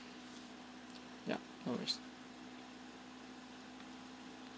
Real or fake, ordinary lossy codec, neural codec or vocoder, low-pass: real; none; none; none